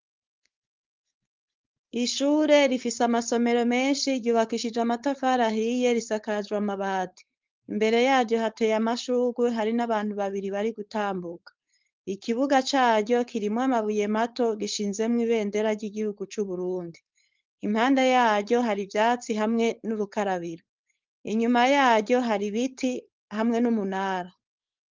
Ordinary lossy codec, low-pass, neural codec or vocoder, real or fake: Opus, 32 kbps; 7.2 kHz; codec, 16 kHz, 4.8 kbps, FACodec; fake